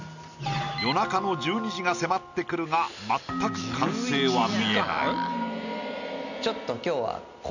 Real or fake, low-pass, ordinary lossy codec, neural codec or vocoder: real; 7.2 kHz; none; none